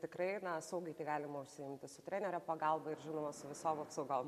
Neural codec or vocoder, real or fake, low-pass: none; real; 14.4 kHz